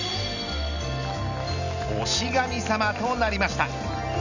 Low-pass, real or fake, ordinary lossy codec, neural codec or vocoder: 7.2 kHz; real; none; none